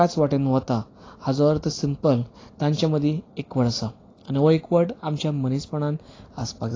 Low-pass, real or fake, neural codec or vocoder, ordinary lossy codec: 7.2 kHz; real; none; AAC, 32 kbps